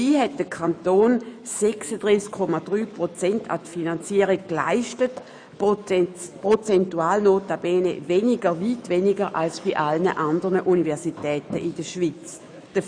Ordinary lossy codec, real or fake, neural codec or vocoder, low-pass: none; fake; autoencoder, 48 kHz, 128 numbers a frame, DAC-VAE, trained on Japanese speech; 9.9 kHz